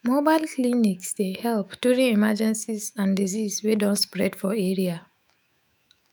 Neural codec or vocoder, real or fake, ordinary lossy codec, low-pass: autoencoder, 48 kHz, 128 numbers a frame, DAC-VAE, trained on Japanese speech; fake; none; none